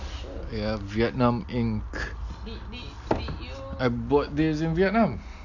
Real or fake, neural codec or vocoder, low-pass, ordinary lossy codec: real; none; 7.2 kHz; none